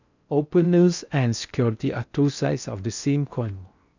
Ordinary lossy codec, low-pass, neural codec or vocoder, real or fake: none; 7.2 kHz; codec, 16 kHz in and 24 kHz out, 0.6 kbps, FocalCodec, streaming, 4096 codes; fake